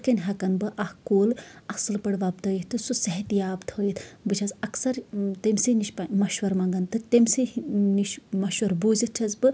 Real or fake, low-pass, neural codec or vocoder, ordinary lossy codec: real; none; none; none